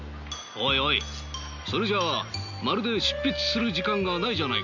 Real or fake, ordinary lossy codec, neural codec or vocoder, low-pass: real; none; none; 7.2 kHz